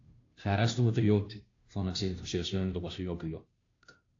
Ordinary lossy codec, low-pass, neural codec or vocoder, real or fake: AAC, 48 kbps; 7.2 kHz; codec, 16 kHz, 0.5 kbps, FunCodec, trained on Chinese and English, 25 frames a second; fake